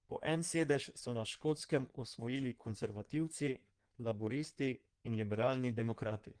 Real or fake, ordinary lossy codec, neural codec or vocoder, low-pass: fake; Opus, 24 kbps; codec, 16 kHz in and 24 kHz out, 1.1 kbps, FireRedTTS-2 codec; 9.9 kHz